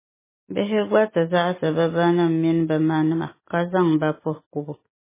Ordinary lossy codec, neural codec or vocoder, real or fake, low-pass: MP3, 16 kbps; none; real; 3.6 kHz